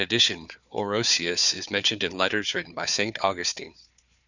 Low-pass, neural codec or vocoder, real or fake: 7.2 kHz; codec, 16 kHz, 4 kbps, FunCodec, trained on Chinese and English, 50 frames a second; fake